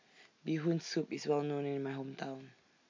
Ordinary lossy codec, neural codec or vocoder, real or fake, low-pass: MP3, 48 kbps; none; real; 7.2 kHz